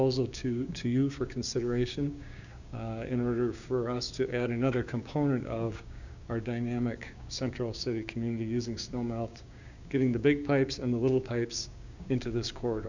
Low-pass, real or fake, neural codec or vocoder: 7.2 kHz; fake; codec, 16 kHz, 6 kbps, DAC